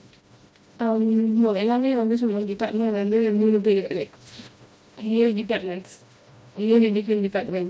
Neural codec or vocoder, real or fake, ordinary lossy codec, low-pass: codec, 16 kHz, 1 kbps, FreqCodec, smaller model; fake; none; none